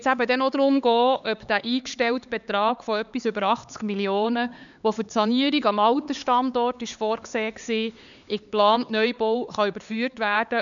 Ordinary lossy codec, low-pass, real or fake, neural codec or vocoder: none; 7.2 kHz; fake; codec, 16 kHz, 4 kbps, X-Codec, HuBERT features, trained on LibriSpeech